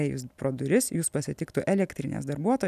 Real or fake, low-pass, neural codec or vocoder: real; 14.4 kHz; none